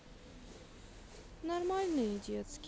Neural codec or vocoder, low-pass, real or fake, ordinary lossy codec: none; none; real; none